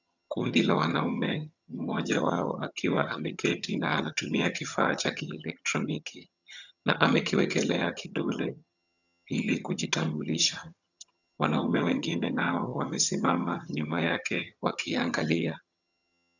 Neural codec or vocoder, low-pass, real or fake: vocoder, 22.05 kHz, 80 mel bands, HiFi-GAN; 7.2 kHz; fake